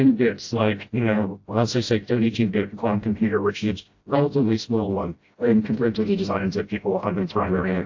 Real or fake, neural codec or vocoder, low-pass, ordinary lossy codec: fake; codec, 16 kHz, 0.5 kbps, FreqCodec, smaller model; 7.2 kHz; AAC, 48 kbps